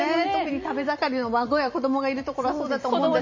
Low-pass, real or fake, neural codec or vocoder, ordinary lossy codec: 7.2 kHz; fake; vocoder, 44.1 kHz, 128 mel bands every 256 samples, BigVGAN v2; MP3, 32 kbps